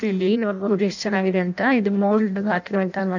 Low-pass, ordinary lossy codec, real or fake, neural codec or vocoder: 7.2 kHz; none; fake; codec, 16 kHz in and 24 kHz out, 0.6 kbps, FireRedTTS-2 codec